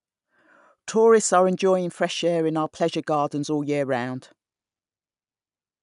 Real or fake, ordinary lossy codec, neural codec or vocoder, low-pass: real; none; none; 10.8 kHz